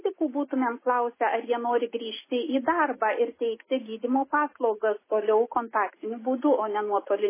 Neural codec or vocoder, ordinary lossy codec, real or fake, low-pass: none; MP3, 16 kbps; real; 3.6 kHz